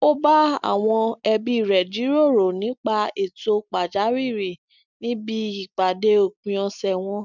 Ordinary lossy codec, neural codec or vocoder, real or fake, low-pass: none; none; real; 7.2 kHz